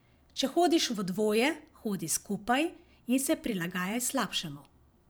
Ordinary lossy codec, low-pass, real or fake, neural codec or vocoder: none; none; fake; vocoder, 44.1 kHz, 128 mel bands every 512 samples, BigVGAN v2